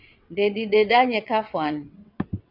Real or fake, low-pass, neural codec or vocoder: fake; 5.4 kHz; vocoder, 22.05 kHz, 80 mel bands, WaveNeXt